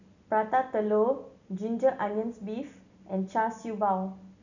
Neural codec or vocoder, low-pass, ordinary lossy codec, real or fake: none; 7.2 kHz; none; real